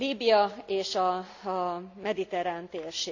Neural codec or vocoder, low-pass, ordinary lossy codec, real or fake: none; 7.2 kHz; none; real